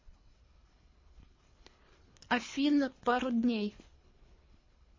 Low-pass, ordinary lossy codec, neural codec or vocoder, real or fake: 7.2 kHz; MP3, 32 kbps; codec, 24 kHz, 3 kbps, HILCodec; fake